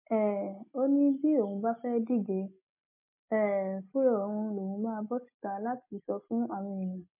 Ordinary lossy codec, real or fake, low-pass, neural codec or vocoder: MP3, 24 kbps; real; 3.6 kHz; none